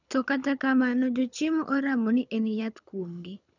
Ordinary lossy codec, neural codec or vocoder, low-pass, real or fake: none; codec, 24 kHz, 6 kbps, HILCodec; 7.2 kHz; fake